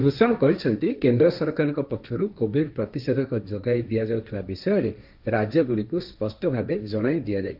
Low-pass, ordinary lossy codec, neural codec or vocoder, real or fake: 5.4 kHz; none; codec, 16 kHz, 1.1 kbps, Voila-Tokenizer; fake